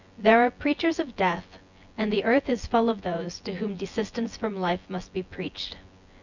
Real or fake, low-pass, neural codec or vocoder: fake; 7.2 kHz; vocoder, 24 kHz, 100 mel bands, Vocos